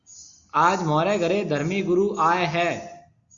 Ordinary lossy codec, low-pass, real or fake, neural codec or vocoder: Opus, 64 kbps; 7.2 kHz; real; none